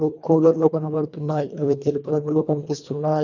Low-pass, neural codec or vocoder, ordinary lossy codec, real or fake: 7.2 kHz; codec, 24 kHz, 1.5 kbps, HILCodec; none; fake